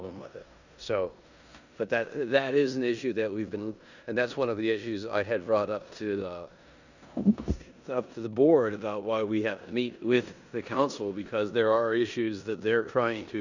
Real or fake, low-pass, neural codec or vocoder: fake; 7.2 kHz; codec, 16 kHz in and 24 kHz out, 0.9 kbps, LongCat-Audio-Codec, four codebook decoder